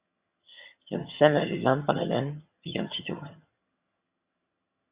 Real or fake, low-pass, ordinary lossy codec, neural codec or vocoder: fake; 3.6 kHz; Opus, 64 kbps; vocoder, 22.05 kHz, 80 mel bands, HiFi-GAN